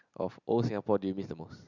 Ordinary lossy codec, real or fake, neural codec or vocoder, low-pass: Opus, 64 kbps; real; none; 7.2 kHz